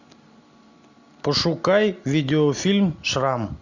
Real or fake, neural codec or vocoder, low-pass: real; none; 7.2 kHz